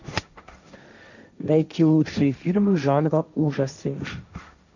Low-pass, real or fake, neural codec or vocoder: 7.2 kHz; fake; codec, 16 kHz, 1.1 kbps, Voila-Tokenizer